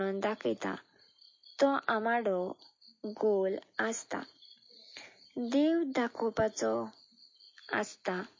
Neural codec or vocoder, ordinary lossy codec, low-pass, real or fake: none; MP3, 32 kbps; 7.2 kHz; real